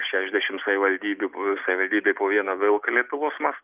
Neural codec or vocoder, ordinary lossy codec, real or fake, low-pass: none; Opus, 24 kbps; real; 3.6 kHz